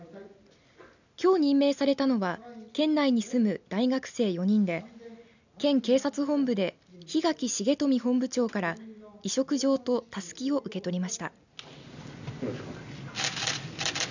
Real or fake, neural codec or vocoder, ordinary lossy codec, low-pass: real; none; none; 7.2 kHz